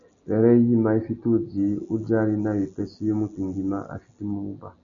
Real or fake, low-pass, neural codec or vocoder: real; 7.2 kHz; none